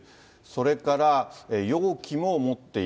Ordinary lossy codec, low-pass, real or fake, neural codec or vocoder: none; none; real; none